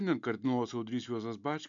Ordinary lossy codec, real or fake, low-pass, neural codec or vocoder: MP3, 64 kbps; real; 7.2 kHz; none